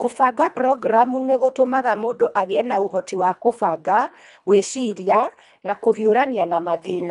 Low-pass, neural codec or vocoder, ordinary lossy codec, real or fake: 10.8 kHz; codec, 24 kHz, 1.5 kbps, HILCodec; none; fake